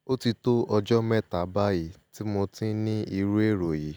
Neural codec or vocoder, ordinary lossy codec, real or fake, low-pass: none; none; real; none